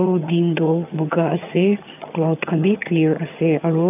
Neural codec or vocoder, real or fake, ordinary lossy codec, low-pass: vocoder, 22.05 kHz, 80 mel bands, HiFi-GAN; fake; none; 3.6 kHz